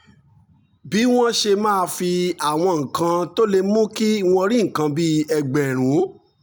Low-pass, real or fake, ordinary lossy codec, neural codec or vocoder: none; real; none; none